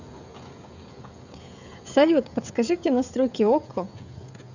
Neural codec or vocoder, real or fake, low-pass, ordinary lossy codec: codec, 16 kHz, 16 kbps, FreqCodec, smaller model; fake; 7.2 kHz; none